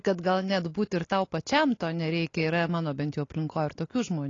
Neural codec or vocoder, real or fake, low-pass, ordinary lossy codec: none; real; 7.2 kHz; AAC, 32 kbps